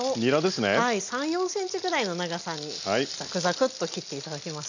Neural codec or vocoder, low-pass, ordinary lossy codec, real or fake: none; 7.2 kHz; none; real